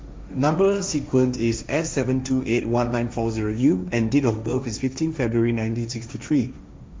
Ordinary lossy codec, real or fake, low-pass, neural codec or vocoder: none; fake; none; codec, 16 kHz, 1.1 kbps, Voila-Tokenizer